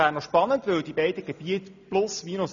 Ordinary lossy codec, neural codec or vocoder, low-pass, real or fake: none; none; 7.2 kHz; real